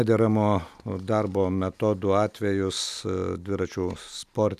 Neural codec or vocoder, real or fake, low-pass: none; real; 14.4 kHz